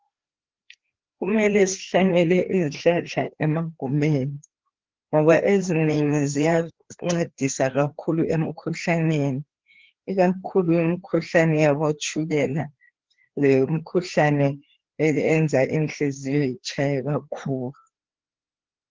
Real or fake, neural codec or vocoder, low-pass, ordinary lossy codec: fake; codec, 16 kHz, 2 kbps, FreqCodec, larger model; 7.2 kHz; Opus, 16 kbps